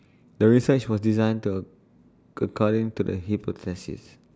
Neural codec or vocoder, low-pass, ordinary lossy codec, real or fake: none; none; none; real